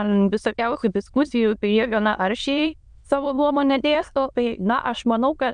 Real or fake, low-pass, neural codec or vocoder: fake; 9.9 kHz; autoencoder, 22.05 kHz, a latent of 192 numbers a frame, VITS, trained on many speakers